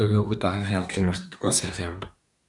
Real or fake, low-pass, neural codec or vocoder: fake; 10.8 kHz; codec, 24 kHz, 1 kbps, SNAC